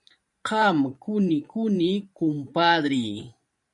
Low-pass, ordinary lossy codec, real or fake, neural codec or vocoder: 10.8 kHz; MP3, 64 kbps; fake; vocoder, 44.1 kHz, 128 mel bands every 512 samples, BigVGAN v2